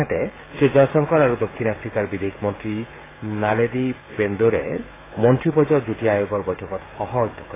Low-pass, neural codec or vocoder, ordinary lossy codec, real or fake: 3.6 kHz; codec, 16 kHz, 16 kbps, FreqCodec, smaller model; AAC, 16 kbps; fake